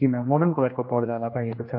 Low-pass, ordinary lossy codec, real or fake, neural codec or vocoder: 5.4 kHz; MP3, 32 kbps; fake; codec, 16 kHz, 1 kbps, X-Codec, HuBERT features, trained on general audio